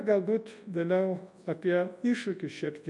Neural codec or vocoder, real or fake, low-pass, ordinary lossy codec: codec, 24 kHz, 0.9 kbps, WavTokenizer, large speech release; fake; 10.8 kHz; MP3, 48 kbps